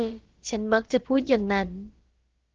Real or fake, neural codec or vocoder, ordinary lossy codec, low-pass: fake; codec, 16 kHz, about 1 kbps, DyCAST, with the encoder's durations; Opus, 16 kbps; 7.2 kHz